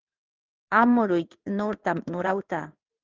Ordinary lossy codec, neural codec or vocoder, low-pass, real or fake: Opus, 32 kbps; codec, 16 kHz in and 24 kHz out, 1 kbps, XY-Tokenizer; 7.2 kHz; fake